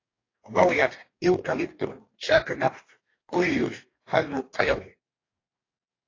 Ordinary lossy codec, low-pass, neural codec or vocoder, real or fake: AAC, 32 kbps; 7.2 kHz; codec, 44.1 kHz, 2.6 kbps, DAC; fake